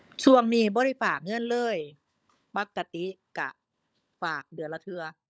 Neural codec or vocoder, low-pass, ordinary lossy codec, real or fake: codec, 16 kHz, 16 kbps, FunCodec, trained on LibriTTS, 50 frames a second; none; none; fake